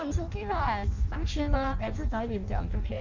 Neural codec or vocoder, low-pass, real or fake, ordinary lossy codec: codec, 16 kHz in and 24 kHz out, 0.6 kbps, FireRedTTS-2 codec; 7.2 kHz; fake; none